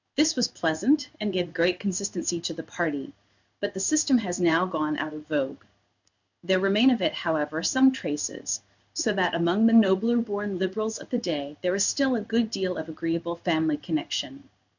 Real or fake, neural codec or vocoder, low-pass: fake; codec, 16 kHz in and 24 kHz out, 1 kbps, XY-Tokenizer; 7.2 kHz